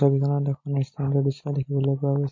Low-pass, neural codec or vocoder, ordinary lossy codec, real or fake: 7.2 kHz; none; MP3, 32 kbps; real